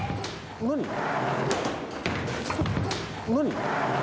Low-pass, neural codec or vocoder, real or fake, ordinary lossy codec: none; none; real; none